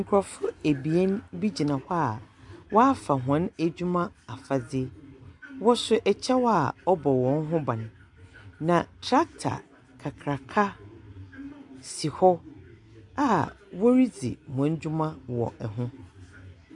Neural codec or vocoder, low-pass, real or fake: none; 10.8 kHz; real